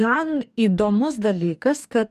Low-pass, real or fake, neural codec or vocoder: 14.4 kHz; fake; codec, 44.1 kHz, 2.6 kbps, DAC